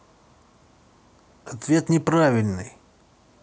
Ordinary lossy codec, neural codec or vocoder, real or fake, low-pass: none; none; real; none